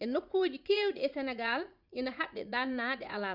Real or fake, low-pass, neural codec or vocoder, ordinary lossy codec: fake; 5.4 kHz; vocoder, 44.1 kHz, 80 mel bands, Vocos; Opus, 64 kbps